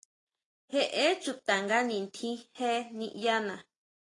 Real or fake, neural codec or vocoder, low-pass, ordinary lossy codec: real; none; 10.8 kHz; AAC, 32 kbps